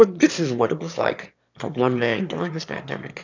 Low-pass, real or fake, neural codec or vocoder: 7.2 kHz; fake; autoencoder, 22.05 kHz, a latent of 192 numbers a frame, VITS, trained on one speaker